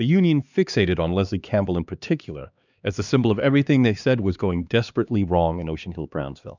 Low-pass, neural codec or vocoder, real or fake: 7.2 kHz; codec, 16 kHz, 2 kbps, X-Codec, HuBERT features, trained on LibriSpeech; fake